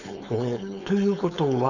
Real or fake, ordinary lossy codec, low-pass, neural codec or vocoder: fake; none; 7.2 kHz; codec, 16 kHz, 4.8 kbps, FACodec